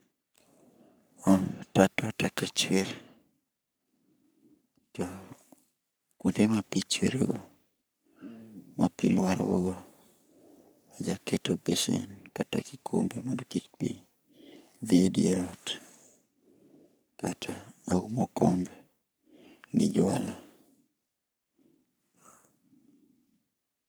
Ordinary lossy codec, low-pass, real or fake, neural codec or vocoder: none; none; fake; codec, 44.1 kHz, 3.4 kbps, Pupu-Codec